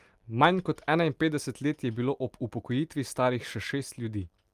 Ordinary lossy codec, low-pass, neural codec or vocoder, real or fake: Opus, 32 kbps; 14.4 kHz; none; real